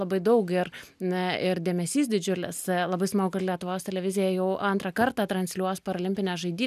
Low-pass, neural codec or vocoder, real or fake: 14.4 kHz; none; real